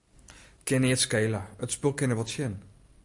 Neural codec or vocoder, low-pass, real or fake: none; 10.8 kHz; real